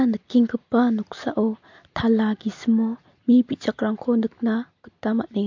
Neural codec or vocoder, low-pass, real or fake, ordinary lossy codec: none; 7.2 kHz; real; MP3, 48 kbps